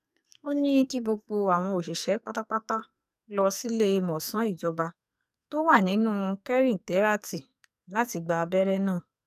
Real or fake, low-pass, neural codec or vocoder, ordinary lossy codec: fake; 14.4 kHz; codec, 44.1 kHz, 2.6 kbps, SNAC; none